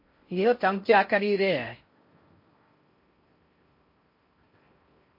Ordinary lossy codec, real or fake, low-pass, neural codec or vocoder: MP3, 32 kbps; fake; 5.4 kHz; codec, 16 kHz in and 24 kHz out, 0.6 kbps, FocalCodec, streaming, 4096 codes